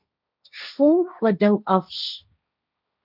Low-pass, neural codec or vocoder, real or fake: 5.4 kHz; codec, 16 kHz, 1.1 kbps, Voila-Tokenizer; fake